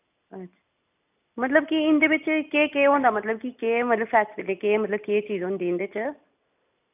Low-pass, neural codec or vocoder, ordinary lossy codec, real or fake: 3.6 kHz; none; none; real